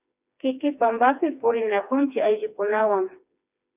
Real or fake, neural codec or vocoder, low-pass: fake; codec, 16 kHz, 2 kbps, FreqCodec, smaller model; 3.6 kHz